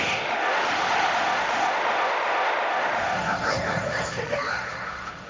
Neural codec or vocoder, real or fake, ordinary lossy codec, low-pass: codec, 16 kHz, 1.1 kbps, Voila-Tokenizer; fake; none; none